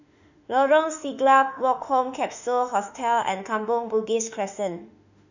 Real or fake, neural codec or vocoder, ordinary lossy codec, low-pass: fake; autoencoder, 48 kHz, 32 numbers a frame, DAC-VAE, trained on Japanese speech; none; 7.2 kHz